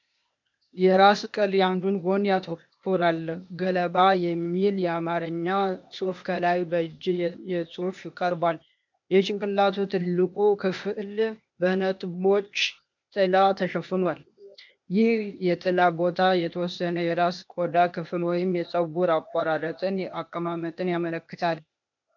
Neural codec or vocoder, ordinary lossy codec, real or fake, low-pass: codec, 16 kHz, 0.8 kbps, ZipCodec; MP3, 64 kbps; fake; 7.2 kHz